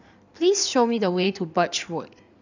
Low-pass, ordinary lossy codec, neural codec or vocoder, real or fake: 7.2 kHz; none; codec, 16 kHz in and 24 kHz out, 2.2 kbps, FireRedTTS-2 codec; fake